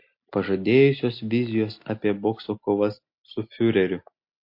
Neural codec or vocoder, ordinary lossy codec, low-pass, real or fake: none; MP3, 32 kbps; 5.4 kHz; real